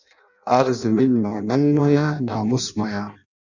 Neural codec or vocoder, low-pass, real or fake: codec, 16 kHz in and 24 kHz out, 0.6 kbps, FireRedTTS-2 codec; 7.2 kHz; fake